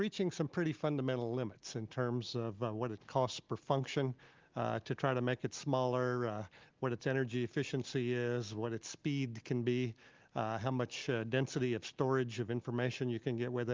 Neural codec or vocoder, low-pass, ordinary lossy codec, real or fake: none; 7.2 kHz; Opus, 32 kbps; real